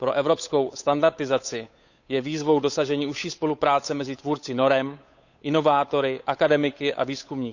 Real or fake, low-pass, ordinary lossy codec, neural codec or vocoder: fake; 7.2 kHz; none; codec, 16 kHz, 8 kbps, FunCodec, trained on Chinese and English, 25 frames a second